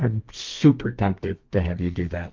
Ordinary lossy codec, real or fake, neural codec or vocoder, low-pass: Opus, 32 kbps; fake; codec, 32 kHz, 1.9 kbps, SNAC; 7.2 kHz